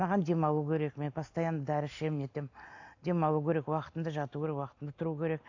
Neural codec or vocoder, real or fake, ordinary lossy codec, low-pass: none; real; AAC, 48 kbps; 7.2 kHz